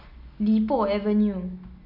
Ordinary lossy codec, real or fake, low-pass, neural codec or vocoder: none; real; 5.4 kHz; none